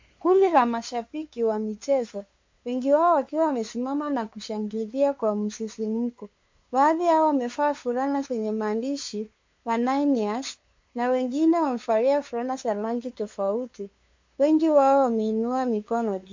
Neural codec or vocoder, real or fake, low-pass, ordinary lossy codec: codec, 16 kHz, 2 kbps, FunCodec, trained on LibriTTS, 25 frames a second; fake; 7.2 kHz; MP3, 48 kbps